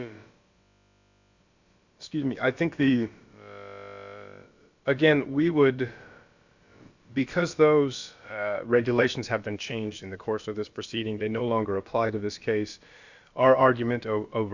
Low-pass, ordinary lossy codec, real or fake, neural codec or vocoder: 7.2 kHz; Opus, 64 kbps; fake; codec, 16 kHz, about 1 kbps, DyCAST, with the encoder's durations